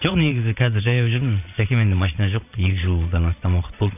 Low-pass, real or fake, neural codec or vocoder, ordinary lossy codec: 3.6 kHz; fake; vocoder, 22.05 kHz, 80 mel bands, Vocos; none